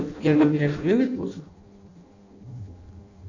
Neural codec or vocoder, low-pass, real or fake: codec, 16 kHz in and 24 kHz out, 0.6 kbps, FireRedTTS-2 codec; 7.2 kHz; fake